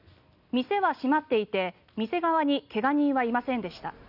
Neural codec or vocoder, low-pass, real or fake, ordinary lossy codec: none; 5.4 kHz; real; none